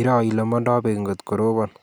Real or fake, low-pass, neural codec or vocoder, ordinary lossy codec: real; none; none; none